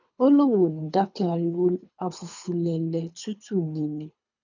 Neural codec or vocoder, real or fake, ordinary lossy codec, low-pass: codec, 24 kHz, 3 kbps, HILCodec; fake; none; 7.2 kHz